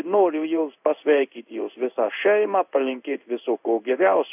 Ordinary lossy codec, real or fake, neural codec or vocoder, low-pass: AAC, 32 kbps; fake; codec, 16 kHz in and 24 kHz out, 1 kbps, XY-Tokenizer; 3.6 kHz